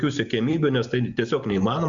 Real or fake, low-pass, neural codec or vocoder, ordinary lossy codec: real; 7.2 kHz; none; Opus, 64 kbps